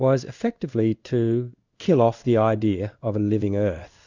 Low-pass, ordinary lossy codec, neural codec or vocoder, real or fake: 7.2 kHz; Opus, 64 kbps; codec, 16 kHz in and 24 kHz out, 1 kbps, XY-Tokenizer; fake